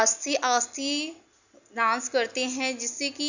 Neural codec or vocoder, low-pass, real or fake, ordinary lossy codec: none; 7.2 kHz; real; none